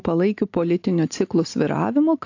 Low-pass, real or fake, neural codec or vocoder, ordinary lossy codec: 7.2 kHz; real; none; AAC, 48 kbps